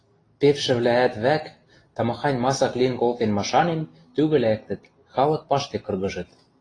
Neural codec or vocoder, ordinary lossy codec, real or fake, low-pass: none; AAC, 32 kbps; real; 9.9 kHz